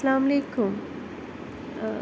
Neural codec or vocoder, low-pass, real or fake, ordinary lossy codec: none; none; real; none